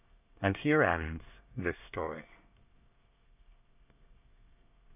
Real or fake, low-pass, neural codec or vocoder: fake; 3.6 kHz; codec, 24 kHz, 1 kbps, SNAC